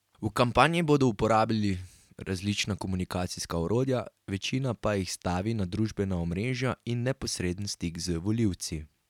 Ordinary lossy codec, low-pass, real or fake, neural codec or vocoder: none; 19.8 kHz; real; none